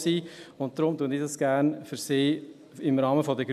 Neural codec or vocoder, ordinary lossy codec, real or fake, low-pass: none; none; real; 14.4 kHz